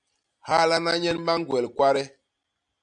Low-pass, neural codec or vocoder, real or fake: 9.9 kHz; none; real